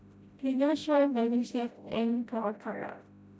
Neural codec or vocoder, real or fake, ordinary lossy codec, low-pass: codec, 16 kHz, 0.5 kbps, FreqCodec, smaller model; fake; none; none